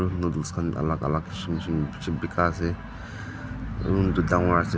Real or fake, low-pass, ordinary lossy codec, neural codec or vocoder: real; none; none; none